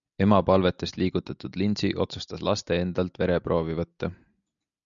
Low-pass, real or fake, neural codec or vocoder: 7.2 kHz; real; none